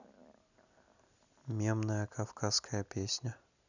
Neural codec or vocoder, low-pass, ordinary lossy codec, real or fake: none; 7.2 kHz; none; real